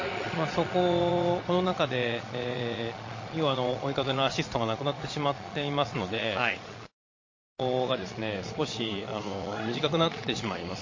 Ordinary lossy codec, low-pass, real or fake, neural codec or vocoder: MP3, 32 kbps; 7.2 kHz; fake; vocoder, 22.05 kHz, 80 mel bands, Vocos